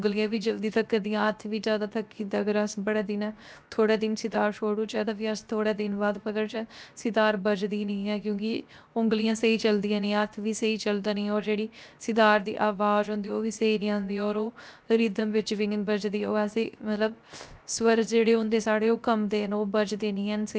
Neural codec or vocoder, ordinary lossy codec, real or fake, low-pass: codec, 16 kHz, 0.7 kbps, FocalCodec; none; fake; none